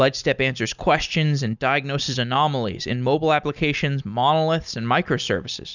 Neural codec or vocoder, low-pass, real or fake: none; 7.2 kHz; real